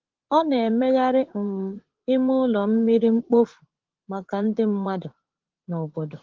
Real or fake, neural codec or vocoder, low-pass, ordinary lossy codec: fake; vocoder, 44.1 kHz, 80 mel bands, Vocos; 7.2 kHz; Opus, 16 kbps